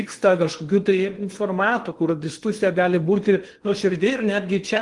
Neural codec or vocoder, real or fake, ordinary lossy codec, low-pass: codec, 16 kHz in and 24 kHz out, 0.8 kbps, FocalCodec, streaming, 65536 codes; fake; Opus, 24 kbps; 10.8 kHz